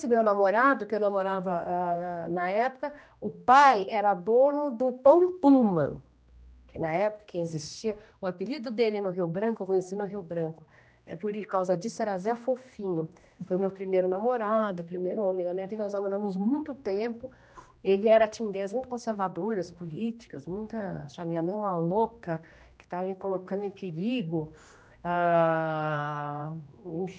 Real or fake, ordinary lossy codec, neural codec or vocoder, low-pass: fake; none; codec, 16 kHz, 1 kbps, X-Codec, HuBERT features, trained on general audio; none